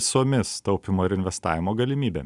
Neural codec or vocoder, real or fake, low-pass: none; real; 10.8 kHz